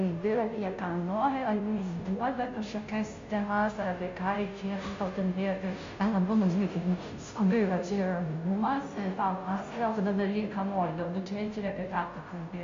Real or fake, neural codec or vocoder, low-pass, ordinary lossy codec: fake; codec, 16 kHz, 0.5 kbps, FunCodec, trained on Chinese and English, 25 frames a second; 7.2 kHz; MP3, 48 kbps